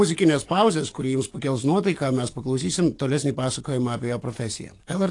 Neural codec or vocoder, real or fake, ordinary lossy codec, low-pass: codec, 44.1 kHz, 7.8 kbps, DAC; fake; AAC, 48 kbps; 10.8 kHz